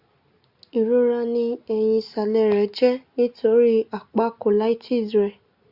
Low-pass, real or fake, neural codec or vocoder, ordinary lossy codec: 5.4 kHz; real; none; Opus, 64 kbps